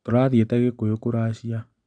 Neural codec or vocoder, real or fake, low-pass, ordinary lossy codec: none; real; 9.9 kHz; none